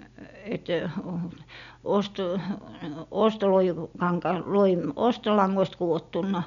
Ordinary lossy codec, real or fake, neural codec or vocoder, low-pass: none; real; none; 7.2 kHz